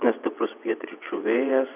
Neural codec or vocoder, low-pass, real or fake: vocoder, 22.05 kHz, 80 mel bands, WaveNeXt; 3.6 kHz; fake